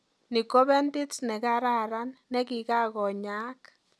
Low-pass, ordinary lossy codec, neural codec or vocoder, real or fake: none; none; none; real